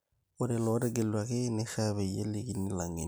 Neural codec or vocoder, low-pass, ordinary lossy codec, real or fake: none; none; none; real